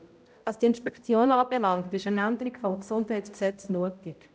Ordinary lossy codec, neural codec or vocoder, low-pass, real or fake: none; codec, 16 kHz, 0.5 kbps, X-Codec, HuBERT features, trained on balanced general audio; none; fake